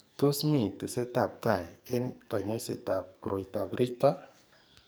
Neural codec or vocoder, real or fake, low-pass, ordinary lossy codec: codec, 44.1 kHz, 2.6 kbps, SNAC; fake; none; none